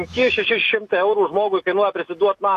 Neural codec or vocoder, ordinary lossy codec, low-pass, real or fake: autoencoder, 48 kHz, 128 numbers a frame, DAC-VAE, trained on Japanese speech; AAC, 64 kbps; 14.4 kHz; fake